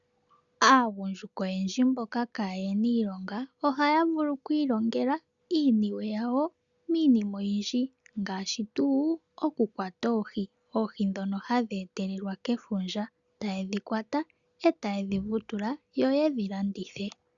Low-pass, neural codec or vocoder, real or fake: 7.2 kHz; none; real